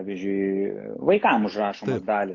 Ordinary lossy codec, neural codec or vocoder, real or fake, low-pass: AAC, 48 kbps; none; real; 7.2 kHz